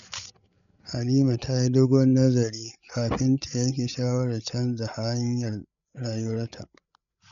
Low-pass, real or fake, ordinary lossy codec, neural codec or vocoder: 7.2 kHz; fake; none; codec, 16 kHz, 8 kbps, FreqCodec, larger model